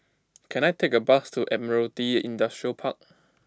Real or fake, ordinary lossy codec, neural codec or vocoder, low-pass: real; none; none; none